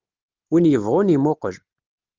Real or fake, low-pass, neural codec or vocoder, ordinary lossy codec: real; 7.2 kHz; none; Opus, 32 kbps